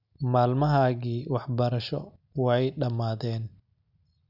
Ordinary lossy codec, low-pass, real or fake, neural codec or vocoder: none; 5.4 kHz; real; none